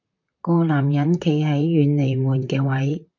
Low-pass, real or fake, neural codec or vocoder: 7.2 kHz; fake; vocoder, 44.1 kHz, 128 mel bands, Pupu-Vocoder